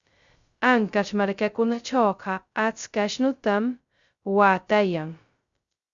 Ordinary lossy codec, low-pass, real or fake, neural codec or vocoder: Opus, 64 kbps; 7.2 kHz; fake; codec, 16 kHz, 0.2 kbps, FocalCodec